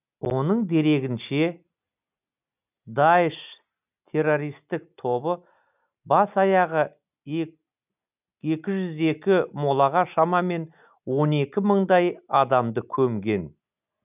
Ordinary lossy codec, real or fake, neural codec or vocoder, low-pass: none; real; none; 3.6 kHz